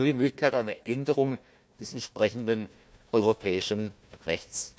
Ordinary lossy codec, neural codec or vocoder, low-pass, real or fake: none; codec, 16 kHz, 1 kbps, FunCodec, trained on Chinese and English, 50 frames a second; none; fake